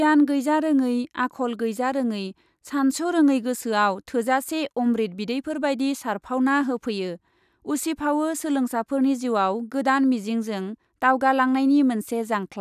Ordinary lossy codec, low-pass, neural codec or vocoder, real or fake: none; 14.4 kHz; none; real